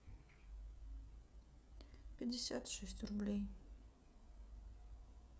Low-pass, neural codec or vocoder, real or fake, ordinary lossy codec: none; codec, 16 kHz, 16 kbps, FreqCodec, smaller model; fake; none